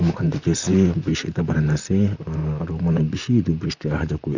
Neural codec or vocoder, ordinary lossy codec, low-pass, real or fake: vocoder, 44.1 kHz, 128 mel bands, Pupu-Vocoder; none; 7.2 kHz; fake